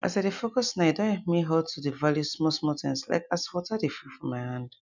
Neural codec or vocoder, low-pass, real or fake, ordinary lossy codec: none; 7.2 kHz; real; none